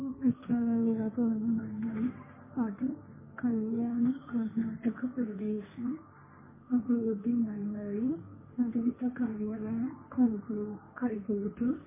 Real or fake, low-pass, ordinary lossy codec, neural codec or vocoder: fake; 3.6 kHz; MP3, 16 kbps; codec, 16 kHz, 1.1 kbps, Voila-Tokenizer